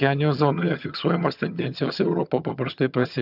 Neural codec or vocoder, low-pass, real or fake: vocoder, 22.05 kHz, 80 mel bands, HiFi-GAN; 5.4 kHz; fake